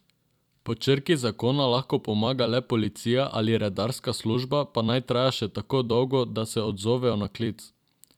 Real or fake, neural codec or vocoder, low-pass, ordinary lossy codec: fake; vocoder, 44.1 kHz, 128 mel bands every 256 samples, BigVGAN v2; 19.8 kHz; none